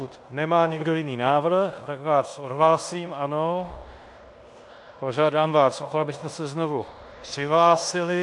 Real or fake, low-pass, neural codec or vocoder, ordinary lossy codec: fake; 10.8 kHz; codec, 16 kHz in and 24 kHz out, 0.9 kbps, LongCat-Audio-Codec, fine tuned four codebook decoder; AAC, 64 kbps